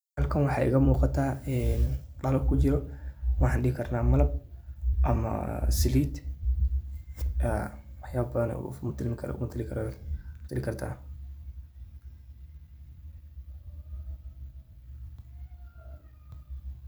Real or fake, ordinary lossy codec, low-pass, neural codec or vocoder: fake; none; none; vocoder, 44.1 kHz, 128 mel bands every 256 samples, BigVGAN v2